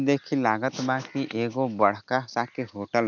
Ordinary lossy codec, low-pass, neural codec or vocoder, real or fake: none; 7.2 kHz; none; real